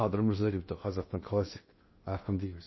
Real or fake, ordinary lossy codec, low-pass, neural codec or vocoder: fake; MP3, 24 kbps; 7.2 kHz; codec, 16 kHz in and 24 kHz out, 0.6 kbps, FocalCodec, streaming, 2048 codes